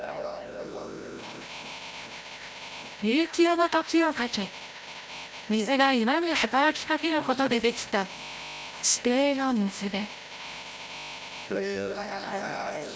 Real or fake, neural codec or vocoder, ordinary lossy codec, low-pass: fake; codec, 16 kHz, 0.5 kbps, FreqCodec, larger model; none; none